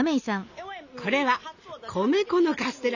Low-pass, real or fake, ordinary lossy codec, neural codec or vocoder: 7.2 kHz; real; none; none